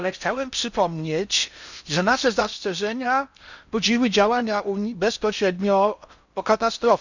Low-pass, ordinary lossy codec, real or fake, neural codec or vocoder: 7.2 kHz; none; fake; codec, 16 kHz in and 24 kHz out, 0.6 kbps, FocalCodec, streaming, 4096 codes